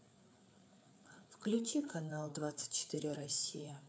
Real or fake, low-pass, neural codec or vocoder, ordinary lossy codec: fake; none; codec, 16 kHz, 4 kbps, FreqCodec, larger model; none